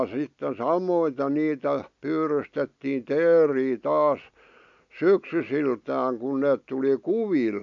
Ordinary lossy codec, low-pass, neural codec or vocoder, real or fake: AAC, 64 kbps; 7.2 kHz; none; real